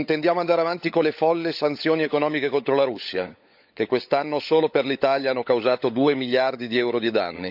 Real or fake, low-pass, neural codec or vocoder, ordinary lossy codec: fake; 5.4 kHz; codec, 16 kHz, 16 kbps, FunCodec, trained on LibriTTS, 50 frames a second; none